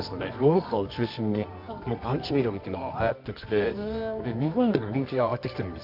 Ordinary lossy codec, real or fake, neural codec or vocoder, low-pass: AAC, 48 kbps; fake; codec, 24 kHz, 0.9 kbps, WavTokenizer, medium music audio release; 5.4 kHz